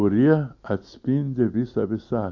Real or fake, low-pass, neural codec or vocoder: real; 7.2 kHz; none